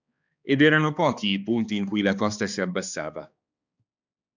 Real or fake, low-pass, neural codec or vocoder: fake; 7.2 kHz; codec, 16 kHz, 2 kbps, X-Codec, HuBERT features, trained on balanced general audio